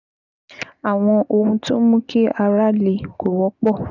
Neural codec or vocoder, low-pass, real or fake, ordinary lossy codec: none; 7.2 kHz; real; none